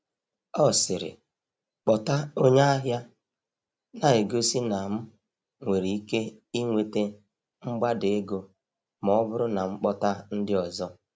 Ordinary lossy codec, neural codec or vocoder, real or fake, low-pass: none; none; real; none